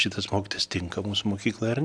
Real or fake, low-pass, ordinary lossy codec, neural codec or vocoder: real; 9.9 kHz; AAC, 64 kbps; none